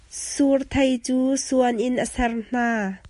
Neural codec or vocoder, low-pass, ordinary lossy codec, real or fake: none; 14.4 kHz; MP3, 48 kbps; real